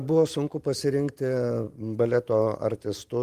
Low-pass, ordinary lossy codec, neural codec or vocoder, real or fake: 14.4 kHz; Opus, 32 kbps; vocoder, 44.1 kHz, 128 mel bands every 512 samples, BigVGAN v2; fake